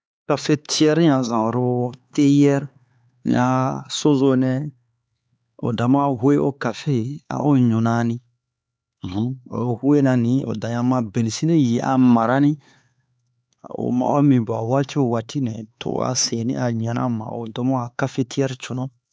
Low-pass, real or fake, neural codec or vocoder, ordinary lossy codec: none; fake; codec, 16 kHz, 4 kbps, X-Codec, HuBERT features, trained on LibriSpeech; none